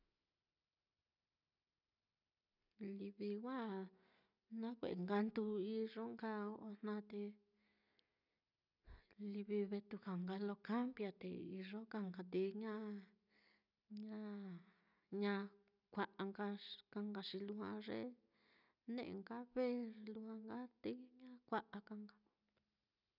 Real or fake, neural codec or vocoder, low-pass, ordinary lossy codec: real; none; 5.4 kHz; none